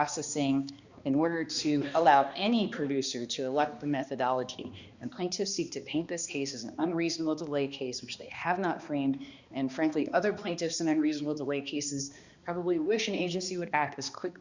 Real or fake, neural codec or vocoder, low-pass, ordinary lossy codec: fake; codec, 16 kHz, 2 kbps, X-Codec, HuBERT features, trained on balanced general audio; 7.2 kHz; Opus, 64 kbps